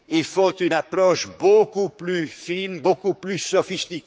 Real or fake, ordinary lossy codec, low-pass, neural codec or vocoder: fake; none; none; codec, 16 kHz, 4 kbps, X-Codec, HuBERT features, trained on general audio